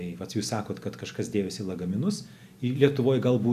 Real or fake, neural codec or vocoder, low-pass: real; none; 14.4 kHz